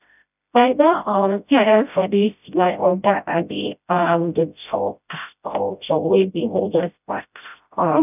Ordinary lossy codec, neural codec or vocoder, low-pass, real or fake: none; codec, 16 kHz, 0.5 kbps, FreqCodec, smaller model; 3.6 kHz; fake